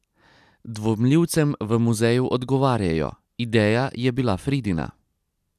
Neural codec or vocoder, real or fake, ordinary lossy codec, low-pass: none; real; none; 14.4 kHz